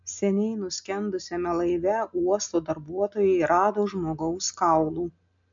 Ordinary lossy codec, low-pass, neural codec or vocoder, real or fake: MP3, 64 kbps; 7.2 kHz; none; real